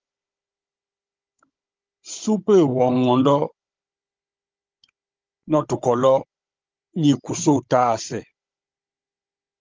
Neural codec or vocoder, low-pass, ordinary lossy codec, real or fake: codec, 16 kHz, 16 kbps, FunCodec, trained on Chinese and English, 50 frames a second; 7.2 kHz; Opus, 24 kbps; fake